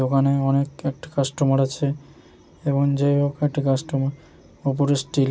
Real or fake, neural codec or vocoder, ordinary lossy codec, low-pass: real; none; none; none